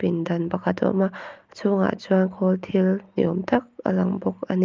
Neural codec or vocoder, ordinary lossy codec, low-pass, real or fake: none; Opus, 32 kbps; 7.2 kHz; real